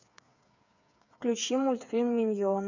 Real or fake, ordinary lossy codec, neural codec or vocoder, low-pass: fake; none; codec, 16 kHz, 8 kbps, FreqCodec, smaller model; 7.2 kHz